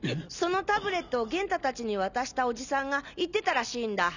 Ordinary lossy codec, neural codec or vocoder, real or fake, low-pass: none; none; real; 7.2 kHz